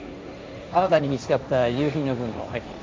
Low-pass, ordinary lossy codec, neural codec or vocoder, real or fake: none; none; codec, 16 kHz, 1.1 kbps, Voila-Tokenizer; fake